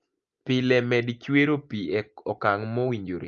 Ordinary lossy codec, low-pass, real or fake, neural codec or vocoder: Opus, 32 kbps; 7.2 kHz; real; none